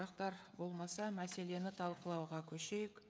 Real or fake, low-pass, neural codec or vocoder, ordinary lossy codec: fake; none; codec, 16 kHz, 16 kbps, FreqCodec, smaller model; none